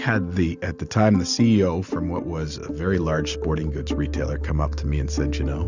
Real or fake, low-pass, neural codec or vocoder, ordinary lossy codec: real; 7.2 kHz; none; Opus, 64 kbps